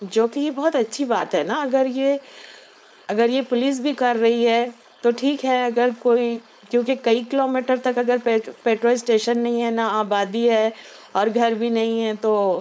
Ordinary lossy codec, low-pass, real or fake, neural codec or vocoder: none; none; fake; codec, 16 kHz, 4.8 kbps, FACodec